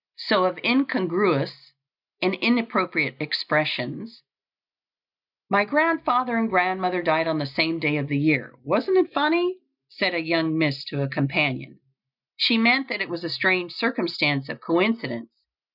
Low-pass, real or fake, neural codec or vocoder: 5.4 kHz; real; none